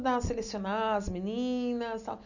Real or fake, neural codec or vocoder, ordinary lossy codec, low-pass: real; none; none; 7.2 kHz